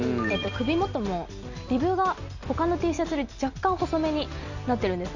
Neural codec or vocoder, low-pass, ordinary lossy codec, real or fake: none; 7.2 kHz; none; real